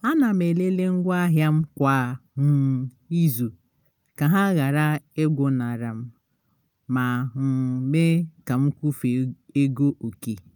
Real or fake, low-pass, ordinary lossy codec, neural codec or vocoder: real; none; none; none